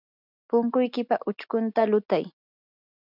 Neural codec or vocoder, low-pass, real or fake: none; 5.4 kHz; real